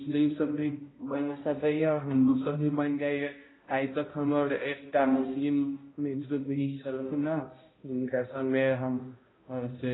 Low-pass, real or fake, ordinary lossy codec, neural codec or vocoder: 7.2 kHz; fake; AAC, 16 kbps; codec, 16 kHz, 0.5 kbps, X-Codec, HuBERT features, trained on balanced general audio